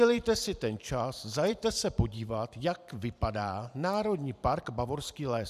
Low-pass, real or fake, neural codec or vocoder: 14.4 kHz; fake; vocoder, 44.1 kHz, 128 mel bands every 512 samples, BigVGAN v2